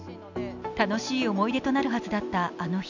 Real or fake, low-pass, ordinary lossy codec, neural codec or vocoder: real; 7.2 kHz; none; none